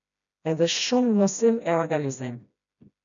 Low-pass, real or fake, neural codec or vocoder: 7.2 kHz; fake; codec, 16 kHz, 1 kbps, FreqCodec, smaller model